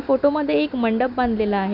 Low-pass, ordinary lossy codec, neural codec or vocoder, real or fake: 5.4 kHz; none; none; real